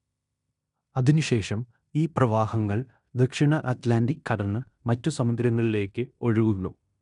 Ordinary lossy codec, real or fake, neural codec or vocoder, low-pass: none; fake; codec, 16 kHz in and 24 kHz out, 0.9 kbps, LongCat-Audio-Codec, fine tuned four codebook decoder; 10.8 kHz